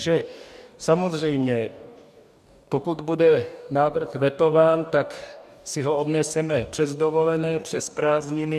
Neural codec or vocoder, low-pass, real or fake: codec, 44.1 kHz, 2.6 kbps, DAC; 14.4 kHz; fake